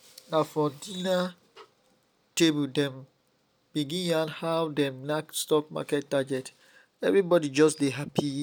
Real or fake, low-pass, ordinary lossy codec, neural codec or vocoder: real; none; none; none